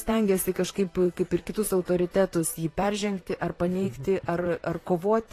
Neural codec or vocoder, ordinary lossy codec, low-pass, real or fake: vocoder, 44.1 kHz, 128 mel bands, Pupu-Vocoder; AAC, 48 kbps; 14.4 kHz; fake